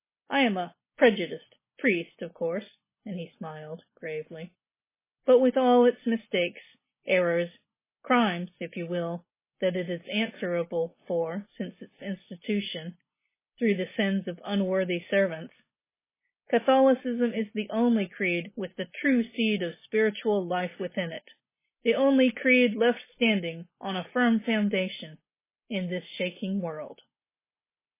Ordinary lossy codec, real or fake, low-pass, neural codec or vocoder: MP3, 16 kbps; real; 3.6 kHz; none